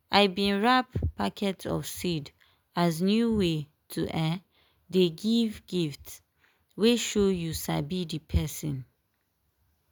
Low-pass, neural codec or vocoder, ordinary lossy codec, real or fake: none; none; none; real